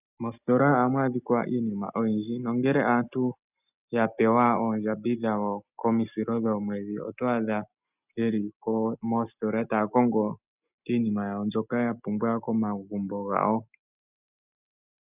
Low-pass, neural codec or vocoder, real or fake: 3.6 kHz; none; real